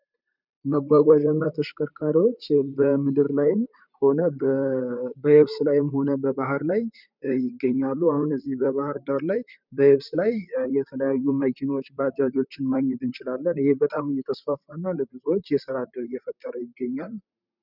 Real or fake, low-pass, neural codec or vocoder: fake; 5.4 kHz; vocoder, 44.1 kHz, 128 mel bands, Pupu-Vocoder